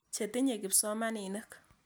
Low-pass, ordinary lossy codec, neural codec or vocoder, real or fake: none; none; none; real